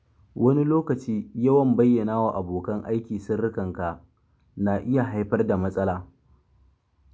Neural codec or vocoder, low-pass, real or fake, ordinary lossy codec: none; none; real; none